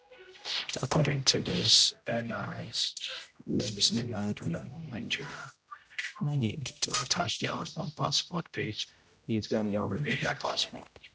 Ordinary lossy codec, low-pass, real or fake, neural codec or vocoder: none; none; fake; codec, 16 kHz, 0.5 kbps, X-Codec, HuBERT features, trained on general audio